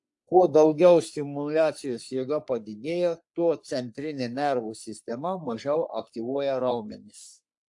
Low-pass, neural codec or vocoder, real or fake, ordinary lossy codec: 10.8 kHz; codec, 44.1 kHz, 3.4 kbps, Pupu-Codec; fake; AAC, 64 kbps